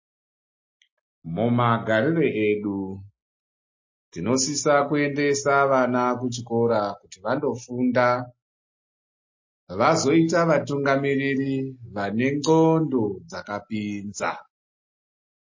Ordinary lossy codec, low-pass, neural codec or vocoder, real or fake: MP3, 32 kbps; 7.2 kHz; none; real